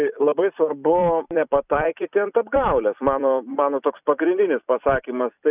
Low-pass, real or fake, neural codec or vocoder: 3.6 kHz; fake; vocoder, 44.1 kHz, 128 mel bands every 256 samples, BigVGAN v2